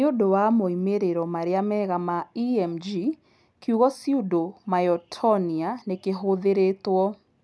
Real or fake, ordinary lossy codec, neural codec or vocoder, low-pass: real; none; none; none